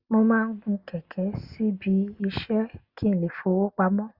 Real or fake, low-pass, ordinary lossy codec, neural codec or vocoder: real; 5.4 kHz; Opus, 64 kbps; none